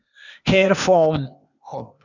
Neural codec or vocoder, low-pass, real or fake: codec, 16 kHz, 0.8 kbps, ZipCodec; 7.2 kHz; fake